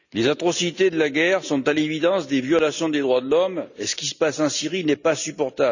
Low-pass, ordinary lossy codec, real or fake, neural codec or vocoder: 7.2 kHz; none; real; none